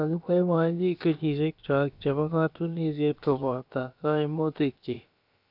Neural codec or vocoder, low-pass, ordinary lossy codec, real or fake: codec, 16 kHz, about 1 kbps, DyCAST, with the encoder's durations; 5.4 kHz; none; fake